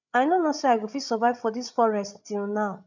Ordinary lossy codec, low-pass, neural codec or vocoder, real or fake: none; 7.2 kHz; codec, 16 kHz, 16 kbps, FreqCodec, larger model; fake